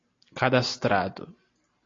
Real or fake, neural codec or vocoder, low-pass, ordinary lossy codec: real; none; 7.2 kHz; AAC, 32 kbps